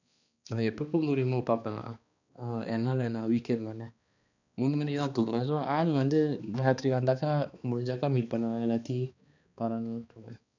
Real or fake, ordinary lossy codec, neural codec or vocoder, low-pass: fake; none; codec, 16 kHz, 2 kbps, X-Codec, HuBERT features, trained on balanced general audio; 7.2 kHz